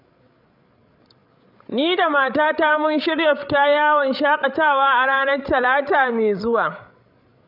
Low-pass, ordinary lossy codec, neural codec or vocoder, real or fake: 5.4 kHz; none; codec, 16 kHz, 16 kbps, FreqCodec, larger model; fake